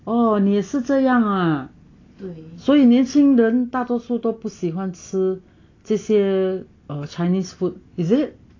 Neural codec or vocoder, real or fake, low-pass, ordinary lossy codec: none; real; 7.2 kHz; AAC, 32 kbps